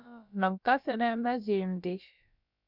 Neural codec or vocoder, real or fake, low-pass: codec, 16 kHz, about 1 kbps, DyCAST, with the encoder's durations; fake; 5.4 kHz